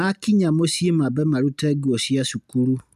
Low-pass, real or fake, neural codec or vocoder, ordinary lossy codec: 14.4 kHz; real; none; none